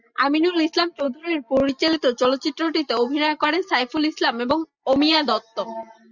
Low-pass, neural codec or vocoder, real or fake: 7.2 kHz; none; real